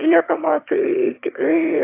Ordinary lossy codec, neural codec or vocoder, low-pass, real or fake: AAC, 16 kbps; autoencoder, 22.05 kHz, a latent of 192 numbers a frame, VITS, trained on one speaker; 3.6 kHz; fake